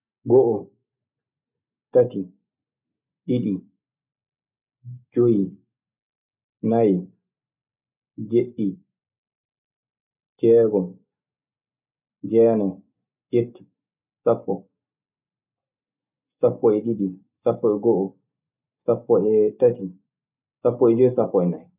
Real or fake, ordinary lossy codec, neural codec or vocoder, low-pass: real; none; none; 3.6 kHz